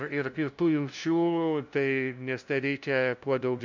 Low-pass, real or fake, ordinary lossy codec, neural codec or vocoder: 7.2 kHz; fake; MP3, 64 kbps; codec, 16 kHz, 0.5 kbps, FunCodec, trained on LibriTTS, 25 frames a second